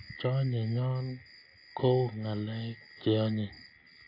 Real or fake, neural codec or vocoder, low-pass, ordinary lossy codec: real; none; 5.4 kHz; AAC, 32 kbps